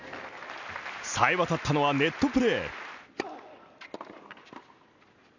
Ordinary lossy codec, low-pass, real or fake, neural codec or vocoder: none; 7.2 kHz; real; none